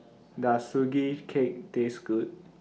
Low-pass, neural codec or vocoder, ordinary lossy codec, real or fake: none; none; none; real